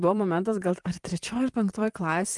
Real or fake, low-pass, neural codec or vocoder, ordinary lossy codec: fake; 10.8 kHz; vocoder, 44.1 kHz, 128 mel bands, Pupu-Vocoder; Opus, 24 kbps